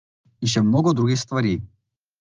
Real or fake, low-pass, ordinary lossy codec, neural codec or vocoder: real; 7.2 kHz; Opus, 32 kbps; none